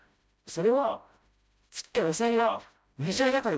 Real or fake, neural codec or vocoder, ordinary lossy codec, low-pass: fake; codec, 16 kHz, 0.5 kbps, FreqCodec, smaller model; none; none